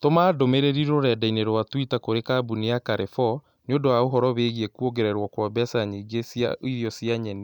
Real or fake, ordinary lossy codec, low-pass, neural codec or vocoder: real; none; 19.8 kHz; none